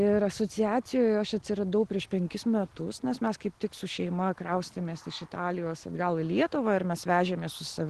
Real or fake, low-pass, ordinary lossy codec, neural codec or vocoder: real; 10.8 kHz; Opus, 16 kbps; none